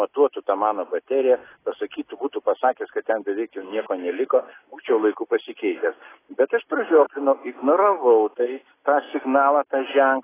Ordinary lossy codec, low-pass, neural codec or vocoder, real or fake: AAC, 16 kbps; 3.6 kHz; none; real